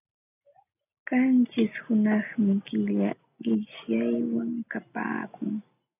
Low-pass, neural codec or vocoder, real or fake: 3.6 kHz; none; real